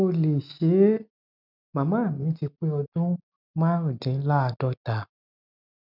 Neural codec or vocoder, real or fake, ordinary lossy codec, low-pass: none; real; none; 5.4 kHz